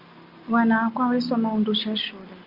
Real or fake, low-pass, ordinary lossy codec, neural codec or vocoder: real; 5.4 kHz; Opus, 32 kbps; none